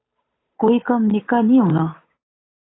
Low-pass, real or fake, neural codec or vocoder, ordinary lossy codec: 7.2 kHz; fake; codec, 16 kHz, 8 kbps, FunCodec, trained on Chinese and English, 25 frames a second; AAC, 16 kbps